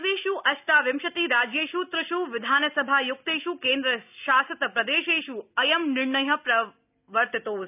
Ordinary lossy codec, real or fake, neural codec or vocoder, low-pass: none; real; none; 3.6 kHz